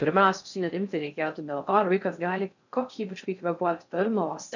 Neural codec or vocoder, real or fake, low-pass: codec, 16 kHz in and 24 kHz out, 0.6 kbps, FocalCodec, streaming, 4096 codes; fake; 7.2 kHz